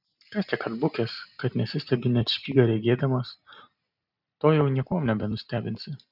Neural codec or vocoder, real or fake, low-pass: none; real; 5.4 kHz